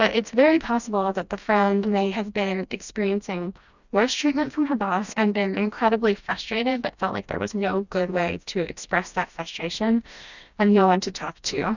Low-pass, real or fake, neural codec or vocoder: 7.2 kHz; fake; codec, 16 kHz, 1 kbps, FreqCodec, smaller model